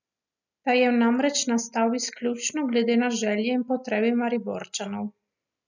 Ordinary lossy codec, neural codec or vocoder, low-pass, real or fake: none; none; 7.2 kHz; real